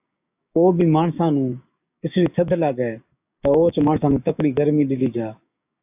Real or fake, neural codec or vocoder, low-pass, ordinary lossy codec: fake; codec, 16 kHz, 6 kbps, DAC; 3.6 kHz; AAC, 32 kbps